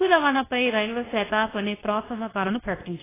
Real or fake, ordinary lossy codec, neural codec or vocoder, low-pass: fake; AAC, 16 kbps; codec, 24 kHz, 0.9 kbps, WavTokenizer, medium speech release version 2; 3.6 kHz